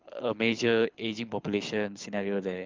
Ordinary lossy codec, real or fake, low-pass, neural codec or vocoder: Opus, 24 kbps; fake; 7.2 kHz; codec, 24 kHz, 6 kbps, HILCodec